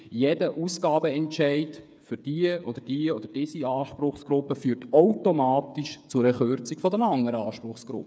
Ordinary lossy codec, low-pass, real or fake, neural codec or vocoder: none; none; fake; codec, 16 kHz, 8 kbps, FreqCodec, smaller model